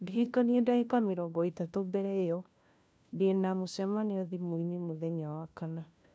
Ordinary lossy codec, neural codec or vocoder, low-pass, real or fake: none; codec, 16 kHz, 1 kbps, FunCodec, trained on LibriTTS, 50 frames a second; none; fake